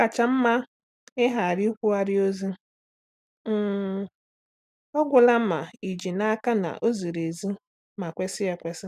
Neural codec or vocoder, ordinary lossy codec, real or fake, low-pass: none; none; real; 14.4 kHz